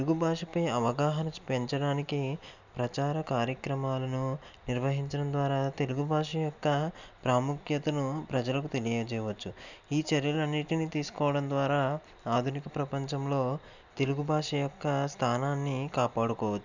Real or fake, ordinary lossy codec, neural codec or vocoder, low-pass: real; none; none; 7.2 kHz